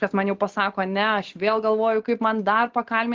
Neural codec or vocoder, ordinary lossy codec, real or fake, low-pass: none; Opus, 16 kbps; real; 7.2 kHz